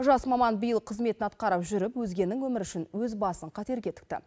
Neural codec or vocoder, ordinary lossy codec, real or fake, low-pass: none; none; real; none